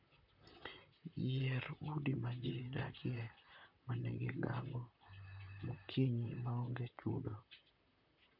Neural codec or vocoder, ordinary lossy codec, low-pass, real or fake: vocoder, 44.1 kHz, 128 mel bands, Pupu-Vocoder; MP3, 48 kbps; 5.4 kHz; fake